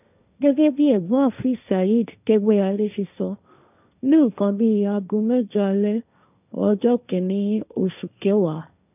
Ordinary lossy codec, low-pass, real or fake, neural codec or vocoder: none; 3.6 kHz; fake; codec, 16 kHz, 1.1 kbps, Voila-Tokenizer